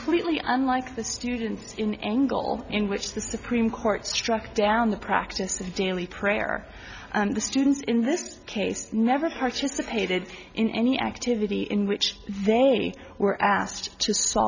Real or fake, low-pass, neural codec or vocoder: real; 7.2 kHz; none